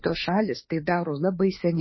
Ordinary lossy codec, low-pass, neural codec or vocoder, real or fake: MP3, 24 kbps; 7.2 kHz; autoencoder, 48 kHz, 32 numbers a frame, DAC-VAE, trained on Japanese speech; fake